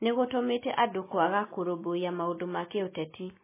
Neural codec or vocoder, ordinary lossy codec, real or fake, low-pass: none; MP3, 16 kbps; real; 3.6 kHz